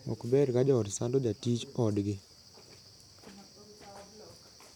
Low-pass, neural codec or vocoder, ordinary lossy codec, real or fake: 19.8 kHz; none; none; real